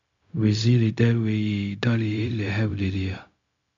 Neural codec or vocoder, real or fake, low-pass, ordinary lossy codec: codec, 16 kHz, 0.4 kbps, LongCat-Audio-Codec; fake; 7.2 kHz; MP3, 64 kbps